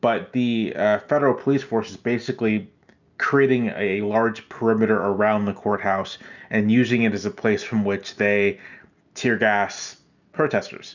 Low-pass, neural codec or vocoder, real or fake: 7.2 kHz; none; real